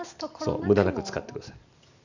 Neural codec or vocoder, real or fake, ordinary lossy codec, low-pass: none; real; none; 7.2 kHz